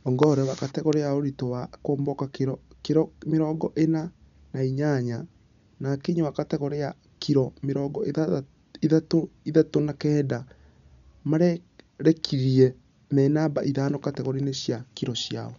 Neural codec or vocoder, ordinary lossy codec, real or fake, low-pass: none; none; real; 7.2 kHz